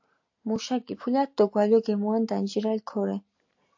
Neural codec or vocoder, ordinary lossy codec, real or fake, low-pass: none; AAC, 48 kbps; real; 7.2 kHz